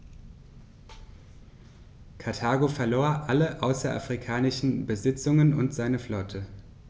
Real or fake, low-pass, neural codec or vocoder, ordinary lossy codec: real; none; none; none